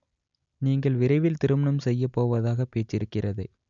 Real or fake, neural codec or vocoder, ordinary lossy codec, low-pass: real; none; none; 7.2 kHz